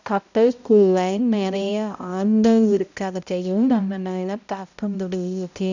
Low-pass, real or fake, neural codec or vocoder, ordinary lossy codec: 7.2 kHz; fake; codec, 16 kHz, 0.5 kbps, X-Codec, HuBERT features, trained on balanced general audio; none